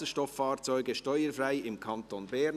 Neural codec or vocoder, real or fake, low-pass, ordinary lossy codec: none; real; 14.4 kHz; none